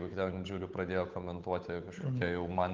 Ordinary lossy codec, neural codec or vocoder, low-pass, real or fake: Opus, 32 kbps; codec, 16 kHz, 8 kbps, FunCodec, trained on Chinese and English, 25 frames a second; 7.2 kHz; fake